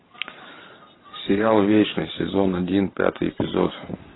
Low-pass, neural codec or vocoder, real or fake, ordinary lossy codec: 7.2 kHz; vocoder, 44.1 kHz, 128 mel bands every 512 samples, BigVGAN v2; fake; AAC, 16 kbps